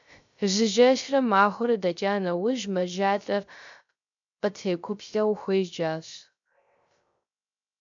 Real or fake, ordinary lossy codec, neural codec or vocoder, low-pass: fake; MP3, 64 kbps; codec, 16 kHz, 0.3 kbps, FocalCodec; 7.2 kHz